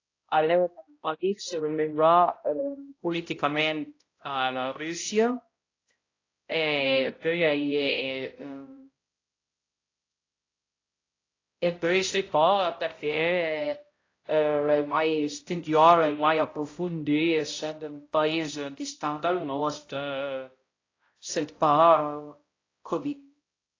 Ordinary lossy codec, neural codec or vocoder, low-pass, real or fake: AAC, 32 kbps; codec, 16 kHz, 0.5 kbps, X-Codec, HuBERT features, trained on balanced general audio; 7.2 kHz; fake